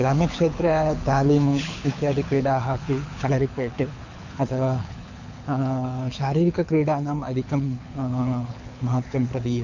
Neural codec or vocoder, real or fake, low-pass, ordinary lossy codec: codec, 24 kHz, 6 kbps, HILCodec; fake; 7.2 kHz; none